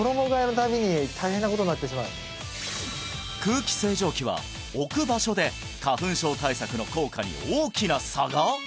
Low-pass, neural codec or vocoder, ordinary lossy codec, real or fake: none; none; none; real